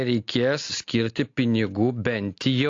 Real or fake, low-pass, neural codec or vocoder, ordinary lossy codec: real; 7.2 kHz; none; AAC, 48 kbps